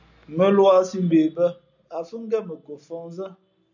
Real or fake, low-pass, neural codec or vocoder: real; 7.2 kHz; none